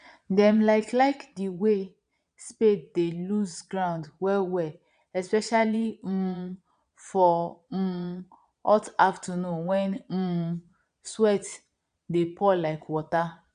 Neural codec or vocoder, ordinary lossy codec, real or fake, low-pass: vocoder, 22.05 kHz, 80 mel bands, Vocos; none; fake; 9.9 kHz